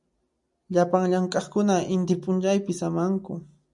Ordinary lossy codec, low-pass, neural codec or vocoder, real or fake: MP3, 96 kbps; 10.8 kHz; none; real